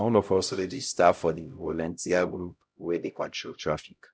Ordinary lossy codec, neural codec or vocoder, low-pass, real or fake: none; codec, 16 kHz, 0.5 kbps, X-Codec, HuBERT features, trained on LibriSpeech; none; fake